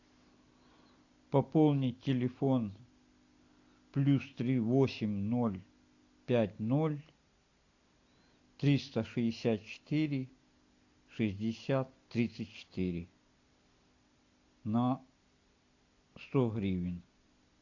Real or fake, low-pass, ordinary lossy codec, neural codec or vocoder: real; 7.2 kHz; AAC, 48 kbps; none